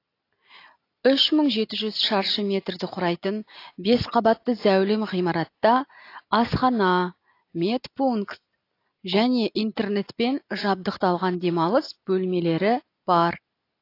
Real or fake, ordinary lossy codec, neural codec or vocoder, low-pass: real; AAC, 32 kbps; none; 5.4 kHz